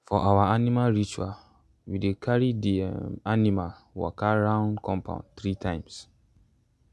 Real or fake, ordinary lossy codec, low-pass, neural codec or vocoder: real; none; none; none